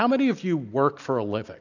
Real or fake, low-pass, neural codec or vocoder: real; 7.2 kHz; none